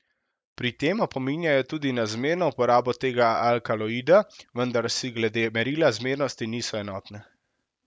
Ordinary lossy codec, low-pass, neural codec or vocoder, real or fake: none; none; none; real